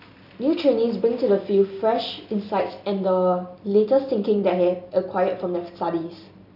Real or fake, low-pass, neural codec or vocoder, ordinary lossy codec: real; 5.4 kHz; none; none